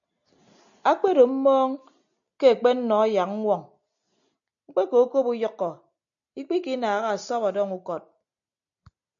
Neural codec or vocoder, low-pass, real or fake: none; 7.2 kHz; real